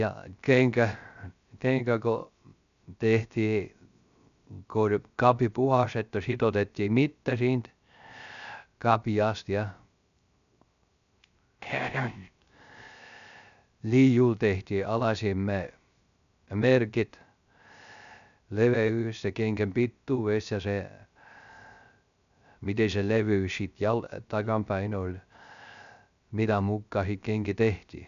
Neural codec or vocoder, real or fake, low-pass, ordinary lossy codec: codec, 16 kHz, 0.3 kbps, FocalCodec; fake; 7.2 kHz; none